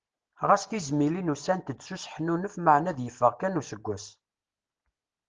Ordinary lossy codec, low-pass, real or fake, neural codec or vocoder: Opus, 16 kbps; 7.2 kHz; real; none